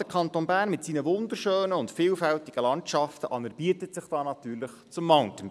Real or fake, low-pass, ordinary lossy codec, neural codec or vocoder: real; none; none; none